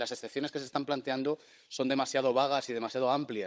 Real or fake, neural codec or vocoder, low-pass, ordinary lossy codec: fake; codec, 16 kHz, 16 kbps, FunCodec, trained on Chinese and English, 50 frames a second; none; none